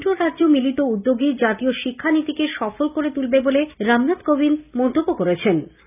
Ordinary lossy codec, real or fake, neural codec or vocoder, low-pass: none; real; none; 3.6 kHz